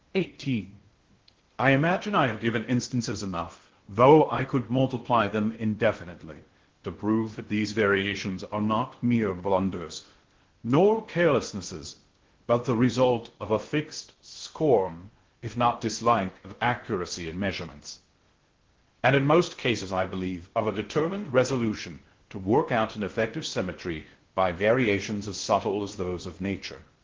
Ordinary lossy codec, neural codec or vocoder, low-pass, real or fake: Opus, 16 kbps; codec, 16 kHz in and 24 kHz out, 0.6 kbps, FocalCodec, streaming, 2048 codes; 7.2 kHz; fake